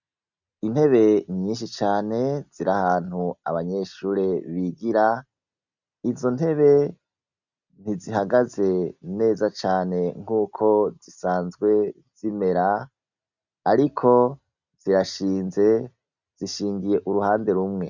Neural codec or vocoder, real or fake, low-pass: none; real; 7.2 kHz